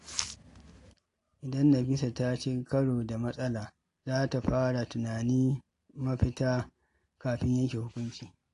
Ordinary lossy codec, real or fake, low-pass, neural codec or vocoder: AAC, 48 kbps; real; 10.8 kHz; none